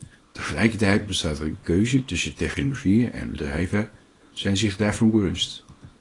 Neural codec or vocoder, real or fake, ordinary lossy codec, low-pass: codec, 24 kHz, 0.9 kbps, WavTokenizer, small release; fake; AAC, 32 kbps; 10.8 kHz